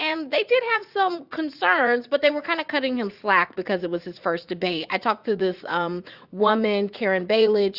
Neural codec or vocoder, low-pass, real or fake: vocoder, 44.1 kHz, 80 mel bands, Vocos; 5.4 kHz; fake